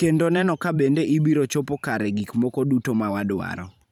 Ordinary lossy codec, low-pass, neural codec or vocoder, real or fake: none; 19.8 kHz; vocoder, 44.1 kHz, 128 mel bands every 512 samples, BigVGAN v2; fake